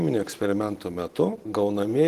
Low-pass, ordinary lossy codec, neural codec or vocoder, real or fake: 14.4 kHz; Opus, 16 kbps; vocoder, 44.1 kHz, 128 mel bands every 512 samples, BigVGAN v2; fake